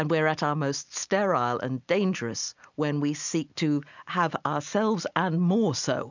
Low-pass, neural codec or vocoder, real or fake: 7.2 kHz; none; real